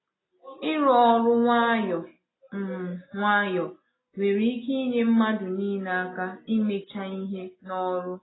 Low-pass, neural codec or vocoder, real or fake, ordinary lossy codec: 7.2 kHz; none; real; AAC, 16 kbps